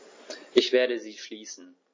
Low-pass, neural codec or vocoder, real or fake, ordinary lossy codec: 7.2 kHz; none; real; MP3, 32 kbps